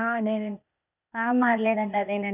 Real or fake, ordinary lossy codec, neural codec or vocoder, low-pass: fake; none; codec, 16 kHz, 0.8 kbps, ZipCodec; 3.6 kHz